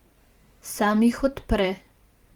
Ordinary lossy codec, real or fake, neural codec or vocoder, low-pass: Opus, 24 kbps; fake; vocoder, 44.1 kHz, 128 mel bands every 512 samples, BigVGAN v2; 19.8 kHz